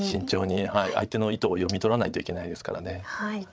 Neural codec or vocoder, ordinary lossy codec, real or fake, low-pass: codec, 16 kHz, 8 kbps, FreqCodec, larger model; none; fake; none